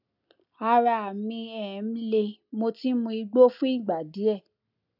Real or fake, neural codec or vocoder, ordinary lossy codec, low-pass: real; none; none; 5.4 kHz